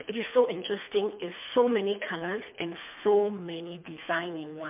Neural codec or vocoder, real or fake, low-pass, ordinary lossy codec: codec, 24 kHz, 3 kbps, HILCodec; fake; 3.6 kHz; MP3, 32 kbps